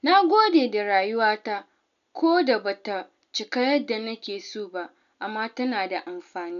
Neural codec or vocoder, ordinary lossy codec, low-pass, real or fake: none; none; 7.2 kHz; real